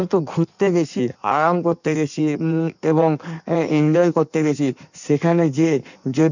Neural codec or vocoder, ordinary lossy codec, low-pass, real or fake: codec, 16 kHz in and 24 kHz out, 1.1 kbps, FireRedTTS-2 codec; none; 7.2 kHz; fake